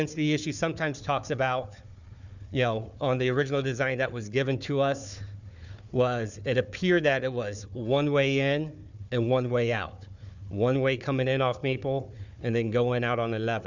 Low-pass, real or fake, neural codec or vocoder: 7.2 kHz; fake; codec, 16 kHz, 4 kbps, FunCodec, trained on Chinese and English, 50 frames a second